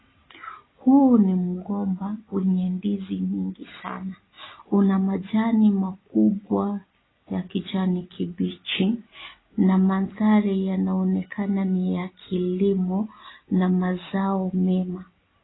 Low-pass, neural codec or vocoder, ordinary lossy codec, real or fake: 7.2 kHz; none; AAC, 16 kbps; real